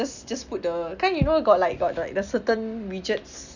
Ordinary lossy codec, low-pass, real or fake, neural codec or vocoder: none; 7.2 kHz; real; none